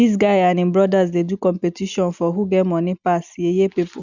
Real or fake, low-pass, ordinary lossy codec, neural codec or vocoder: real; 7.2 kHz; none; none